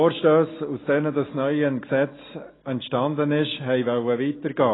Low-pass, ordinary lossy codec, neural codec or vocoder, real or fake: 7.2 kHz; AAC, 16 kbps; none; real